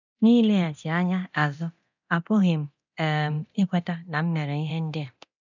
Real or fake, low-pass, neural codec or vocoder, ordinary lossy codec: fake; 7.2 kHz; codec, 24 kHz, 0.9 kbps, DualCodec; none